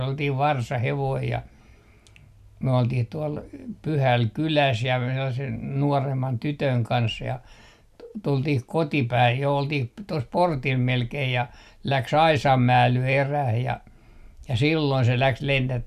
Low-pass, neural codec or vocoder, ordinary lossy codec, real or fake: 14.4 kHz; none; none; real